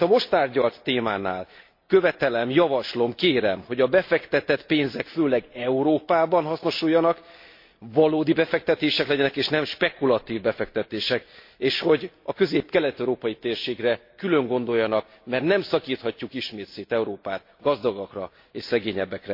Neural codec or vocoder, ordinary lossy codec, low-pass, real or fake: none; MP3, 32 kbps; 5.4 kHz; real